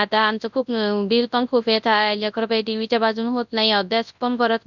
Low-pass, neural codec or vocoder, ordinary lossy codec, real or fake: 7.2 kHz; codec, 24 kHz, 0.9 kbps, WavTokenizer, large speech release; none; fake